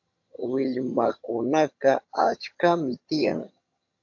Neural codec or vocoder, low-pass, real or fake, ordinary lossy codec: vocoder, 22.05 kHz, 80 mel bands, HiFi-GAN; 7.2 kHz; fake; AAC, 48 kbps